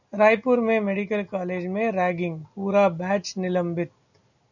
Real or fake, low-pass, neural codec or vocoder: real; 7.2 kHz; none